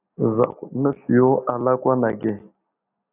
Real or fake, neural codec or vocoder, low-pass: real; none; 3.6 kHz